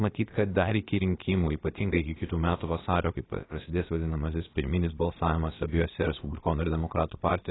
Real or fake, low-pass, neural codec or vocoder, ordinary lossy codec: fake; 7.2 kHz; codec, 16 kHz, about 1 kbps, DyCAST, with the encoder's durations; AAC, 16 kbps